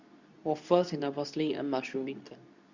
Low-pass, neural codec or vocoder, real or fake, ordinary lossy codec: 7.2 kHz; codec, 24 kHz, 0.9 kbps, WavTokenizer, medium speech release version 2; fake; Opus, 64 kbps